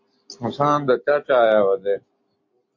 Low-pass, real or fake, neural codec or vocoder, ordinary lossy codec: 7.2 kHz; real; none; MP3, 48 kbps